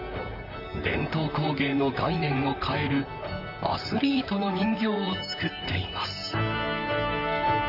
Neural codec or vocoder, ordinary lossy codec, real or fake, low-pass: vocoder, 44.1 kHz, 128 mel bands, Pupu-Vocoder; none; fake; 5.4 kHz